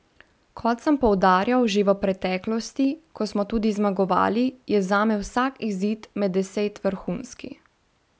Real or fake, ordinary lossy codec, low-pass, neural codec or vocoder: real; none; none; none